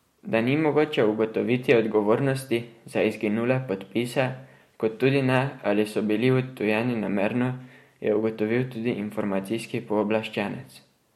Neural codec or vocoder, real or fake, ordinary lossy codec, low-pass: none; real; MP3, 64 kbps; 19.8 kHz